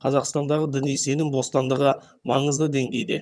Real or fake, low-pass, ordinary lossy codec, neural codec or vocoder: fake; none; none; vocoder, 22.05 kHz, 80 mel bands, HiFi-GAN